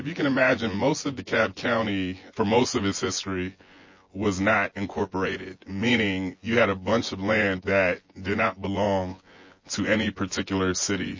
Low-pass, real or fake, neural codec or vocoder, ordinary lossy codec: 7.2 kHz; fake; vocoder, 24 kHz, 100 mel bands, Vocos; MP3, 32 kbps